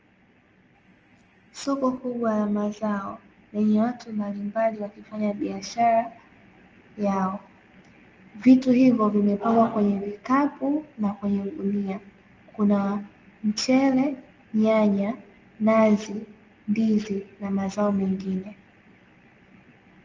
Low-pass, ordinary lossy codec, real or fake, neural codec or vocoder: 7.2 kHz; Opus, 24 kbps; real; none